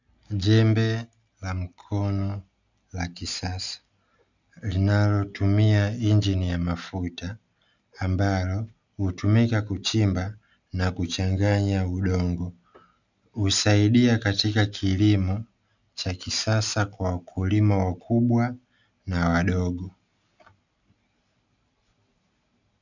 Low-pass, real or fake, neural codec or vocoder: 7.2 kHz; real; none